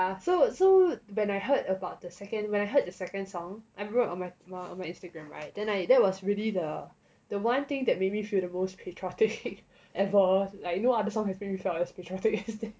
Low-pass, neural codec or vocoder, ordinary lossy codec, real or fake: none; none; none; real